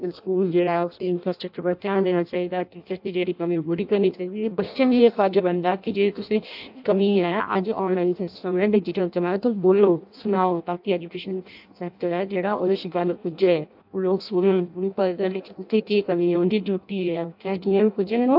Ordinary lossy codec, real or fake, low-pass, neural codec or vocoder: AAC, 48 kbps; fake; 5.4 kHz; codec, 16 kHz in and 24 kHz out, 0.6 kbps, FireRedTTS-2 codec